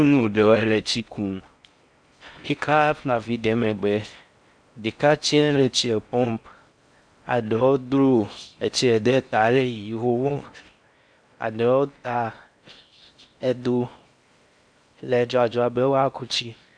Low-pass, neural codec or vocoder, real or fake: 9.9 kHz; codec, 16 kHz in and 24 kHz out, 0.6 kbps, FocalCodec, streaming, 4096 codes; fake